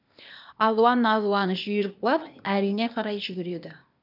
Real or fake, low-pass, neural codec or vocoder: fake; 5.4 kHz; codec, 16 kHz, 0.8 kbps, ZipCodec